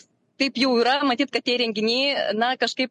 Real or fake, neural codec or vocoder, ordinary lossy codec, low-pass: real; none; MP3, 48 kbps; 14.4 kHz